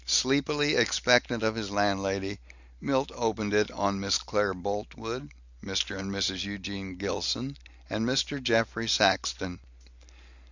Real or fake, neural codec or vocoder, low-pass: real; none; 7.2 kHz